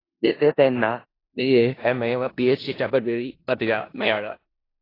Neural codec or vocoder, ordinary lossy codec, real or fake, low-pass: codec, 16 kHz in and 24 kHz out, 0.4 kbps, LongCat-Audio-Codec, four codebook decoder; AAC, 24 kbps; fake; 5.4 kHz